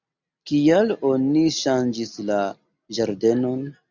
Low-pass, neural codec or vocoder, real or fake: 7.2 kHz; none; real